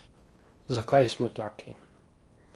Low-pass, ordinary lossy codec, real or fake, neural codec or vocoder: 10.8 kHz; Opus, 32 kbps; fake; codec, 16 kHz in and 24 kHz out, 0.8 kbps, FocalCodec, streaming, 65536 codes